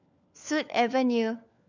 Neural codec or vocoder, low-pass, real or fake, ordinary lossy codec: codec, 16 kHz, 4 kbps, FunCodec, trained on LibriTTS, 50 frames a second; 7.2 kHz; fake; none